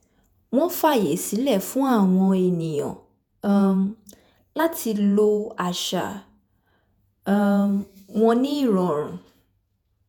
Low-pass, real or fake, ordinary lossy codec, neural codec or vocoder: none; fake; none; vocoder, 48 kHz, 128 mel bands, Vocos